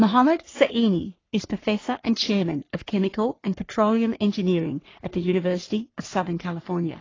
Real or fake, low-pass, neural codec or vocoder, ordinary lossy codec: fake; 7.2 kHz; codec, 44.1 kHz, 3.4 kbps, Pupu-Codec; AAC, 32 kbps